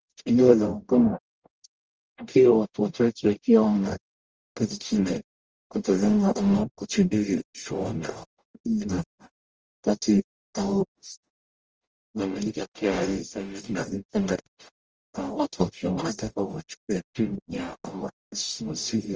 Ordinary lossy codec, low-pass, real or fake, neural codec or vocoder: Opus, 24 kbps; 7.2 kHz; fake; codec, 44.1 kHz, 0.9 kbps, DAC